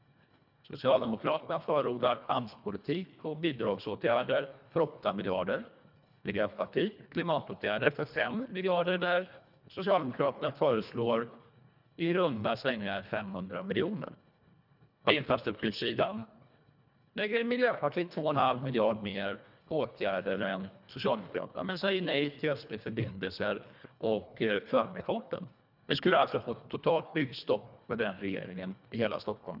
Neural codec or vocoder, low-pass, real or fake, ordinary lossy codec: codec, 24 kHz, 1.5 kbps, HILCodec; 5.4 kHz; fake; none